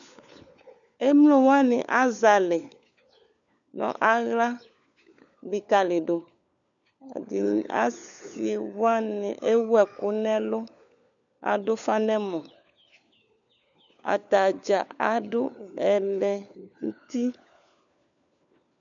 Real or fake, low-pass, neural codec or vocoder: fake; 7.2 kHz; codec, 16 kHz, 4 kbps, FunCodec, trained on LibriTTS, 50 frames a second